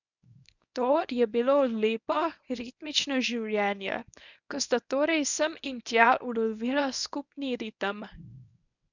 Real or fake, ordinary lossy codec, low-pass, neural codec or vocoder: fake; none; 7.2 kHz; codec, 24 kHz, 0.9 kbps, WavTokenizer, medium speech release version 1